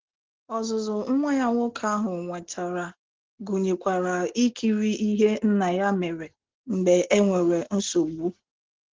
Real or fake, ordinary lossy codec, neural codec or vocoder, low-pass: real; Opus, 16 kbps; none; 7.2 kHz